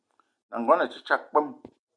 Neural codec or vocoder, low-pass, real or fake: none; 9.9 kHz; real